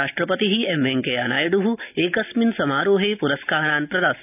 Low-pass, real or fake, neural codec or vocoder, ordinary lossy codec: 3.6 kHz; real; none; none